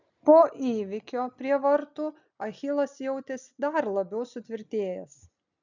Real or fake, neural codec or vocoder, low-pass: real; none; 7.2 kHz